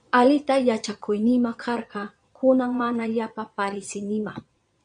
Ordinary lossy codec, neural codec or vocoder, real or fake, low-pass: MP3, 64 kbps; vocoder, 22.05 kHz, 80 mel bands, Vocos; fake; 9.9 kHz